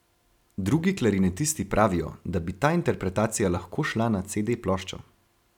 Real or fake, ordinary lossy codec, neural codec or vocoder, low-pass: real; none; none; 19.8 kHz